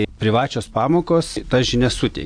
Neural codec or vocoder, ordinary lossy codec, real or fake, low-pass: none; AAC, 64 kbps; real; 9.9 kHz